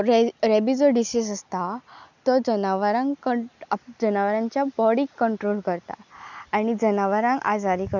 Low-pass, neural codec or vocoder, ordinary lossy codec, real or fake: 7.2 kHz; none; none; real